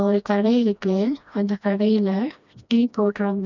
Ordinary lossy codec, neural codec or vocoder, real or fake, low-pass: none; codec, 16 kHz, 1 kbps, FreqCodec, smaller model; fake; 7.2 kHz